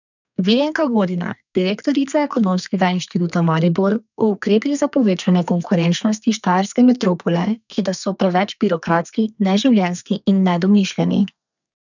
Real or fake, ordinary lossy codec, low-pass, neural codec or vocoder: fake; none; 7.2 kHz; codec, 32 kHz, 1.9 kbps, SNAC